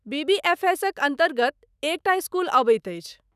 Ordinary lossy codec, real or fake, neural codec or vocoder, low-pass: none; fake; autoencoder, 48 kHz, 128 numbers a frame, DAC-VAE, trained on Japanese speech; 14.4 kHz